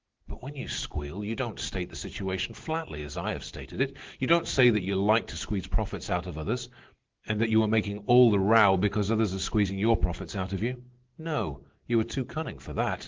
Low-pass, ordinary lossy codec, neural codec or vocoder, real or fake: 7.2 kHz; Opus, 16 kbps; none; real